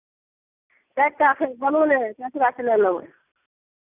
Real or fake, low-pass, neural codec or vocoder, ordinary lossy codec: real; 3.6 kHz; none; none